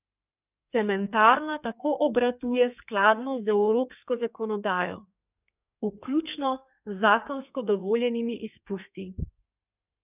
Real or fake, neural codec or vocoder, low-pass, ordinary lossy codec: fake; codec, 32 kHz, 1.9 kbps, SNAC; 3.6 kHz; none